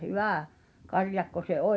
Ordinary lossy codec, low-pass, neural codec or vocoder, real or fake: none; none; none; real